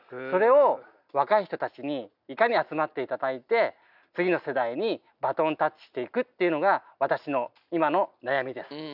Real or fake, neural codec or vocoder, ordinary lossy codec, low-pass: real; none; none; 5.4 kHz